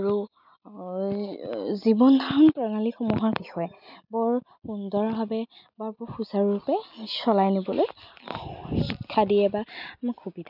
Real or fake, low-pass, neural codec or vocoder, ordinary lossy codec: real; 5.4 kHz; none; none